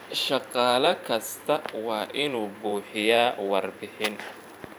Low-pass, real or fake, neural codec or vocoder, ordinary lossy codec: 19.8 kHz; fake; vocoder, 44.1 kHz, 128 mel bands every 512 samples, BigVGAN v2; none